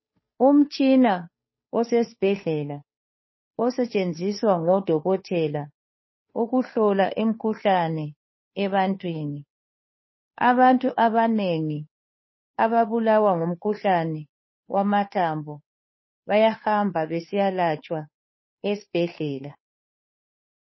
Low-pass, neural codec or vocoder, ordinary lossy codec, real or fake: 7.2 kHz; codec, 16 kHz, 2 kbps, FunCodec, trained on Chinese and English, 25 frames a second; MP3, 24 kbps; fake